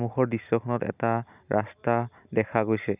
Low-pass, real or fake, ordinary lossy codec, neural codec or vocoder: 3.6 kHz; real; none; none